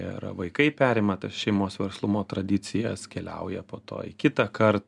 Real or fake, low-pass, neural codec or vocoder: real; 10.8 kHz; none